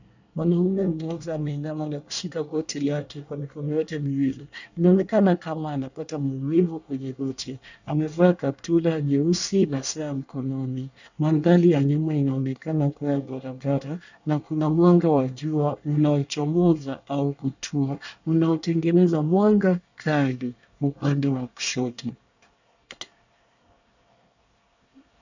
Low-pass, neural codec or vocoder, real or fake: 7.2 kHz; codec, 24 kHz, 1 kbps, SNAC; fake